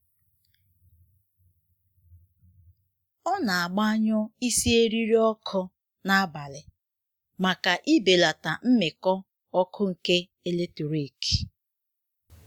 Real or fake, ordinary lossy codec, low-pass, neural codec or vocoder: real; Opus, 64 kbps; 19.8 kHz; none